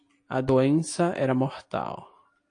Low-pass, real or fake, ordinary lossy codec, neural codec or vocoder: 9.9 kHz; real; AAC, 48 kbps; none